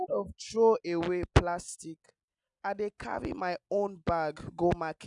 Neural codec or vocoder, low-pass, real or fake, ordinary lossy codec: none; 10.8 kHz; real; none